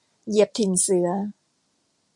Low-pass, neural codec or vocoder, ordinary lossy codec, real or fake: 10.8 kHz; none; MP3, 64 kbps; real